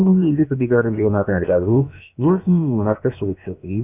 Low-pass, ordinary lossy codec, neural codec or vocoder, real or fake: 3.6 kHz; none; codec, 16 kHz, about 1 kbps, DyCAST, with the encoder's durations; fake